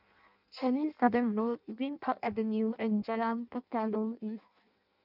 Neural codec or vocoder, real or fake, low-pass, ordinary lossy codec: codec, 16 kHz in and 24 kHz out, 0.6 kbps, FireRedTTS-2 codec; fake; 5.4 kHz; none